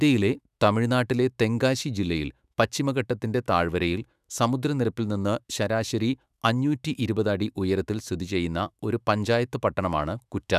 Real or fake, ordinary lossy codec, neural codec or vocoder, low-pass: fake; none; autoencoder, 48 kHz, 128 numbers a frame, DAC-VAE, trained on Japanese speech; 14.4 kHz